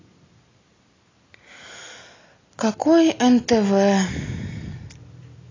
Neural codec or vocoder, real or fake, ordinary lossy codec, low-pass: none; real; AAC, 32 kbps; 7.2 kHz